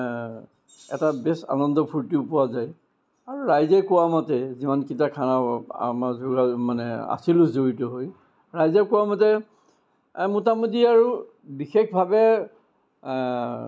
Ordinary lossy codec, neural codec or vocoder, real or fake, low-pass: none; none; real; none